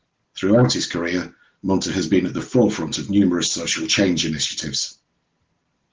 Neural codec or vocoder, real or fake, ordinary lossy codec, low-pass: none; real; Opus, 16 kbps; 7.2 kHz